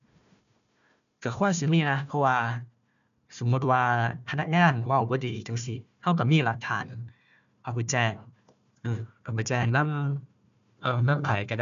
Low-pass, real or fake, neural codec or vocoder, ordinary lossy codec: 7.2 kHz; fake; codec, 16 kHz, 1 kbps, FunCodec, trained on Chinese and English, 50 frames a second; none